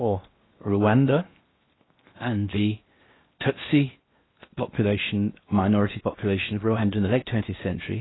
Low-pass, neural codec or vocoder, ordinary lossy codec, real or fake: 7.2 kHz; codec, 16 kHz in and 24 kHz out, 0.8 kbps, FocalCodec, streaming, 65536 codes; AAC, 16 kbps; fake